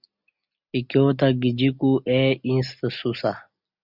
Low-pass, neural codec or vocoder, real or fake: 5.4 kHz; none; real